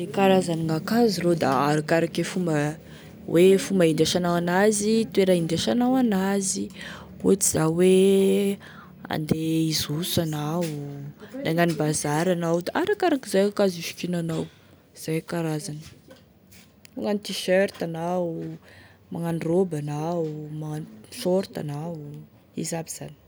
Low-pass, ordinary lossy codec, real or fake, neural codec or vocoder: none; none; real; none